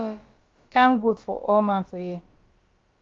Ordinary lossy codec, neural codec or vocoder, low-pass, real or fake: Opus, 32 kbps; codec, 16 kHz, about 1 kbps, DyCAST, with the encoder's durations; 7.2 kHz; fake